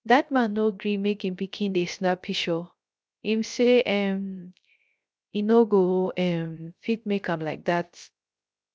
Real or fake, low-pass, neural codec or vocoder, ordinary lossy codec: fake; none; codec, 16 kHz, 0.3 kbps, FocalCodec; none